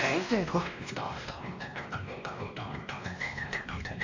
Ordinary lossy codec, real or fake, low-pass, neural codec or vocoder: AAC, 48 kbps; fake; 7.2 kHz; codec, 16 kHz, 1 kbps, X-Codec, WavLM features, trained on Multilingual LibriSpeech